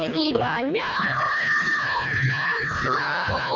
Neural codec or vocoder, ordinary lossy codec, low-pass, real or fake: codec, 24 kHz, 1.5 kbps, HILCodec; AAC, 48 kbps; 7.2 kHz; fake